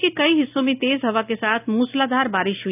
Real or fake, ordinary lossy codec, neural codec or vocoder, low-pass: real; none; none; 3.6 kHz